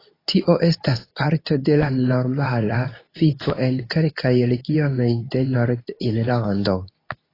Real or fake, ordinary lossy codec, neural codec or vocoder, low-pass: fake; AAC, 24 kbps; codec, 24 kHz, 0.9 kbps, WavTokenizer, medium speech release version 2; 5.4 kHz